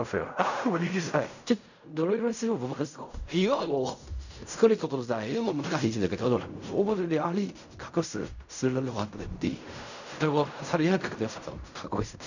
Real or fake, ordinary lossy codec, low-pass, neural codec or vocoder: fake; none; 7.2 kHz; codec, 16 kHz in and 24 kHz out, 0.4 kbps, LongCat-Audio-Codec, fine tuned four codebook decoder